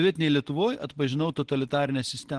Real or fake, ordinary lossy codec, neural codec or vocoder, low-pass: real; Opus, 16 kbps; none; 10.8 kHz